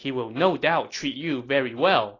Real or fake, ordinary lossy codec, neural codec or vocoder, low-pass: real; AAC, 32 kbps; none; 7.2 kHz